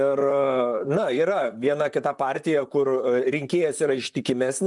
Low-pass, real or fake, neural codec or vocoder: 10.8 kHz; fake; vocoder, 44.1 kHz, 128 mel bands, Pupu-Vocoder